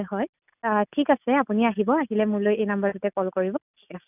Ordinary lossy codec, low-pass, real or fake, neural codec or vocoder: none; 3.6 kHz; real; none